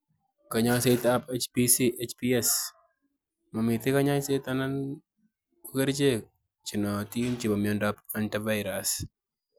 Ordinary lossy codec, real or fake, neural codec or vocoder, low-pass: none; real; none; none